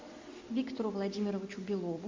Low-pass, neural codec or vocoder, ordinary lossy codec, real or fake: 7.2 kHz; none; MP3, 48 kbps; real